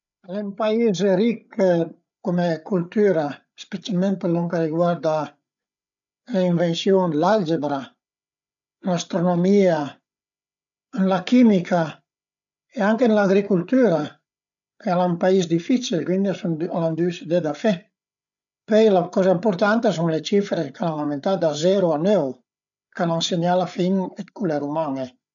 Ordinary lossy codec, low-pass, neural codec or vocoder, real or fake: none; 7.2 kHz; codec, 16 kHz, 8 kbps, FreqCodec, larger model; fake